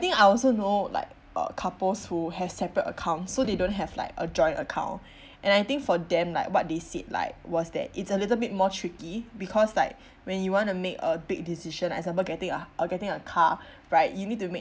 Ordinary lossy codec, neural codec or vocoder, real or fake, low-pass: none; none; real; none